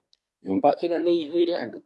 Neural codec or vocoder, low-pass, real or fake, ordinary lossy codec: codec, 24 kHz, 1 kbps, SNAC; none; fake; none